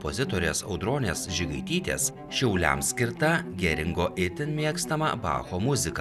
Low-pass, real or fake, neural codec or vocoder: 14.4 kHz; real; none